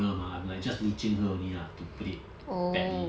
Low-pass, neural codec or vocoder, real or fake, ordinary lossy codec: none; none; real; none